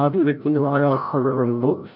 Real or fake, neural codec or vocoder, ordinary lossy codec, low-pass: fake; codec, 16 kHz, 0.5 kbps, FreqCodec, larger model; none; 5.4 kHz